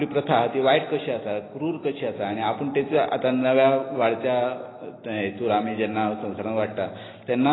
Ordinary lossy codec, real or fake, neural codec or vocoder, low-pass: AAC, 16 kbps; real; none; 7.2 kHz